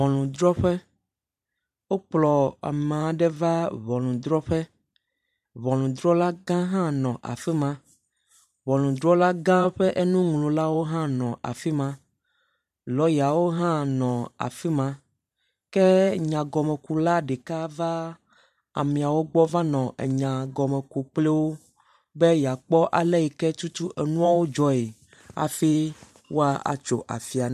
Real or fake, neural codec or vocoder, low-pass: fake; vocoder, 44.1 kHz, 128 mel bands every 512 samples, BigVGAN v2; 14.4 kHz